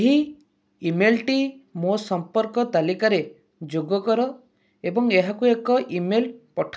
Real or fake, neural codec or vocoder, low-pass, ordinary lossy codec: real; none; none; none